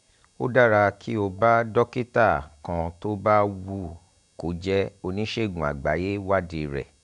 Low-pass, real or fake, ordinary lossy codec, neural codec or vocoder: 10.8 kHz; real; none; none